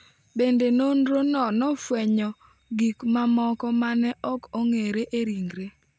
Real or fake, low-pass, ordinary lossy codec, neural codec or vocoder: real; none; none; none